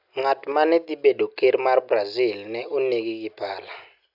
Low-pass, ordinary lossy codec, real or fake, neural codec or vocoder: 5.4 kHz; AAC, 48 kbps; real; none